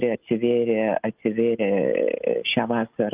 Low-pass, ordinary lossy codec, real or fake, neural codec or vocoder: 3.6 kHz; Opus, 24 kbps; real; none